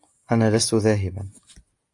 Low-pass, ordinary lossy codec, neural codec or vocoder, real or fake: 10.8 kHz; AAC, 64 kbps; none; real